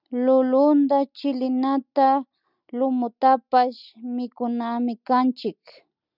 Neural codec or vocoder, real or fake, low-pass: none; real; 5.4 kHz